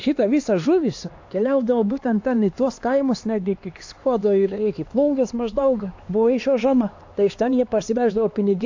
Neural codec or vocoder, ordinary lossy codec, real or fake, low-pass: codec, 16 kHz, 2 kbps, X-Codec, HuBERT features, trained on LibriSpeech; AAC, 48 kbps; fake; 7.2 kHz